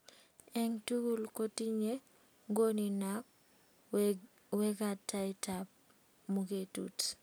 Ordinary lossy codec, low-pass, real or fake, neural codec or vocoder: none; none; real; none